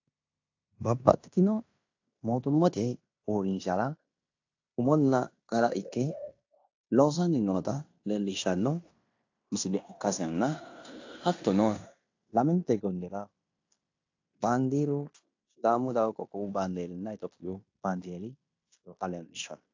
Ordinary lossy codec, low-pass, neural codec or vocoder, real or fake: AAC, 48 kbps; 7.2 kHz; codec, 16 kHz in and 24 kHz out, 0.9 kbps, LongCat-Audio-Codec, fine tuned four codebook decoder; fake